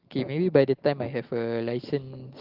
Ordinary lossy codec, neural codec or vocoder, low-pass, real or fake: Opus, 16 kbps; none; 5.4 kHz; real